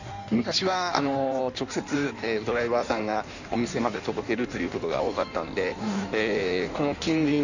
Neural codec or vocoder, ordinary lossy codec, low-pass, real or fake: codec, 16 kHz in and 24 kHz out, 1.1 kbps, FireRedTTS-2 codec; none; 7.2 kHz; fake